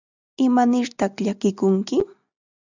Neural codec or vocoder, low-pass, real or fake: none; 7.2 kHz; real